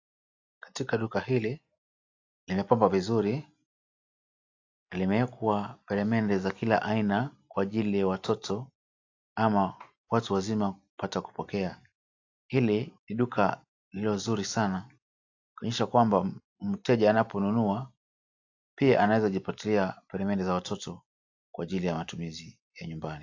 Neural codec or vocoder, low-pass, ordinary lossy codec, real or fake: none; 7.2 kHz; AAC, 48 kbps; real